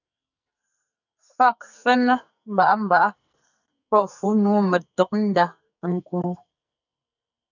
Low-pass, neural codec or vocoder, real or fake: 7.2 kHz; codec, 44.1 kHz, 2.6 kbps, SNAC; fake